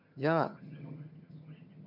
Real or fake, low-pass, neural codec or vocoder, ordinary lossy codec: fake; 5.4 kHz; vocoder, 22.05 kHz, 80 mel bands, HiFi-GAN; none